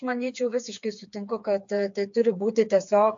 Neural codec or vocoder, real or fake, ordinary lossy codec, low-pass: codec, 16 kHz, 4 kbps, FreqCodec, smaller model; fake; AAC, 64 kbps; 7.2 kHz